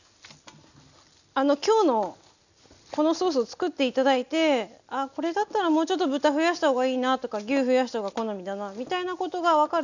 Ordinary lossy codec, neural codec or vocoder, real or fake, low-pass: none; none; real; 7.2 kHz